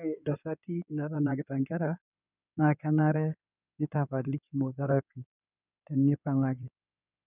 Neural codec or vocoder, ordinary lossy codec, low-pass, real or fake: codec, 16 kHz in and 24 kHz out, 2.2 kbps, FireRedTTS-2 codec; none; 3.6 kHz; fake